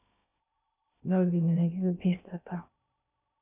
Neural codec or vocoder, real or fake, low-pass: codec, 16 kHz in and 24 kHz out, 0.6 kbps, FocalCodec, streaming, 2048 codes; fake; 3.6 kHz